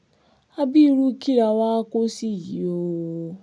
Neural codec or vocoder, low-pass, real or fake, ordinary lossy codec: none; 9.9 kHz; real; none